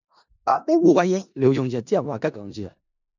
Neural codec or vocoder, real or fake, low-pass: codec, 16 kHz in and 24 kHz out, 0.4 kbps, LongCat-Audio-Codec, four codebook decoder; fake; 7.2 kHz